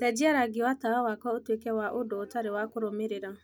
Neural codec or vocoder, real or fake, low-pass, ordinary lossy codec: none; real; none; none